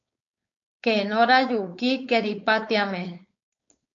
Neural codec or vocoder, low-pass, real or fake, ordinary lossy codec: codec, 16 kHz, 4.8 kbps, FACodec; 7.2 kHz; fake; MP3, 48 kbps